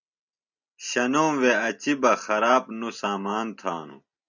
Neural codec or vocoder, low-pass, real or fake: none; 7.2 kHz; real